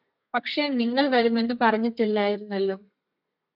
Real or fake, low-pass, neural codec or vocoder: fake; 5.4 kHz; codec, 32 kHz, 1.9 kbps, SNAC